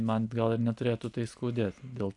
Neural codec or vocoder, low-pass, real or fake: none; 10.8 kHz; real